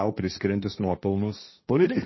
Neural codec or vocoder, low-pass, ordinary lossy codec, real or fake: codec, 16 kHz, 1.1 kbps, Voila-Tokenizer; 7.2 kHz; MP3, 24 kbps; fake